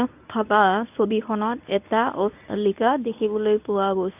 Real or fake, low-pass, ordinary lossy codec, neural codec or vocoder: fake; 3.6 kHz; none; codec, 24 kHz, 0.9 kbps, WavTokenizer, medium speech release version 1